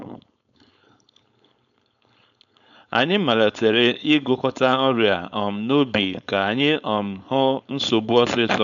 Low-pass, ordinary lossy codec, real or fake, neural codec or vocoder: 7.2 kHz; none; fake; codec, 16 kHz, 4.8 kbps, FACodec